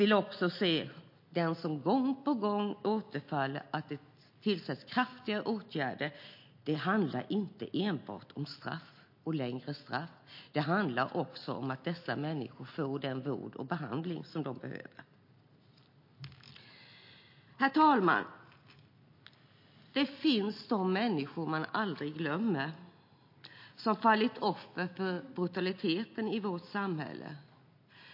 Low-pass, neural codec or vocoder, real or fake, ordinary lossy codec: 5.4 kHz; none; real; MP3, 32 kbps